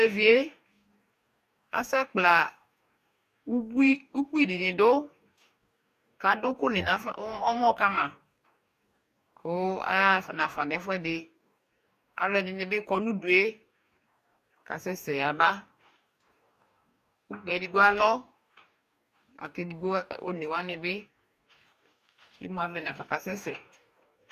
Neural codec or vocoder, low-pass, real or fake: codec, 44.1 kHz, 2.6 kbps, DAC; 14.4 kHz; fake